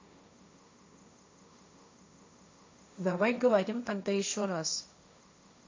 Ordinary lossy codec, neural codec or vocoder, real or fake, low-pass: MP3, 48 kbps; codec, 16 kHz, 1.1 kbps, Voila-Tokenizer; fake; 7.2 kHz